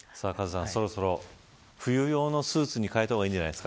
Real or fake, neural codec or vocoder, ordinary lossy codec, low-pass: real; none; none; none